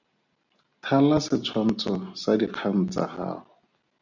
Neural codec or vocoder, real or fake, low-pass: none; real; 7.2 kHz